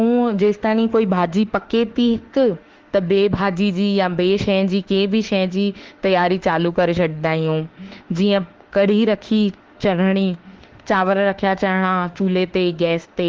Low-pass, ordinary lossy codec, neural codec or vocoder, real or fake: 7.2 kHz; Opus, 16 kbps; autoencoder, 48 kHz, 32 numbers a frame, DAC-VAE, trained on Japanese speech; fake